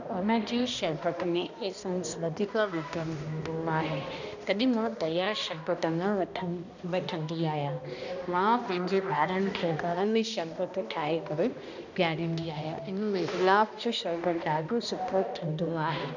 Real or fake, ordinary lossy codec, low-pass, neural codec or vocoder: fake; none; 7.2 kHz; codec, 16 kHz, 1 kbps, X-Codec, HuBERT features, trained on balanced general audio